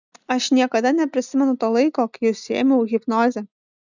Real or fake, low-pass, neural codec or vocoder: real; 7.2 kHz; none